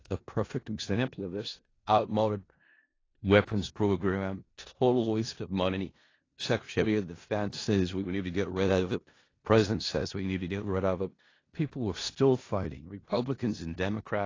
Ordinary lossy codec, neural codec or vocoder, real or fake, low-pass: AAC, 32 kbps; codec, 16 kHz in and 24 kHz out, 0.4 kbps, LongCat-Audio-Codec, four codebook decoder; fake; 7.2 kHz